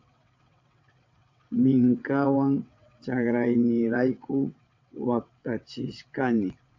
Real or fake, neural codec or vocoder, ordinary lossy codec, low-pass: fake; vocoder, 22.05 kHz, 80 mel bands, WaveNeXt; MP3, 64 kbps; 7.2 kHz